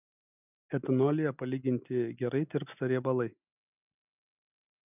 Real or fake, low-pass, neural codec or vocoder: real; 3.6 kHz; none